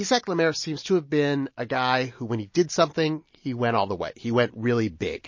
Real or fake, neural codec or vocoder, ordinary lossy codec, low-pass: real; none; MP3, 32 kbps; 7.2 kHz